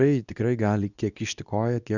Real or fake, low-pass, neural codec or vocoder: fake; 7.2 kHz; codec, 24 kHz, 0.9 kbps, WavTokenizer, medium speech release version 2